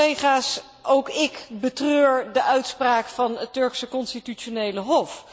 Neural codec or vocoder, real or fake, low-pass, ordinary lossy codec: none; real; none; none